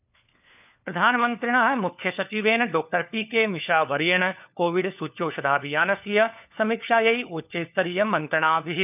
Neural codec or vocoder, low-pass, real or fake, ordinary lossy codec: codec, 16 kHz, 4 kbps, FunCodec, trained on LibriTTS, 50 frames a second; 3.6 kHz; fake; none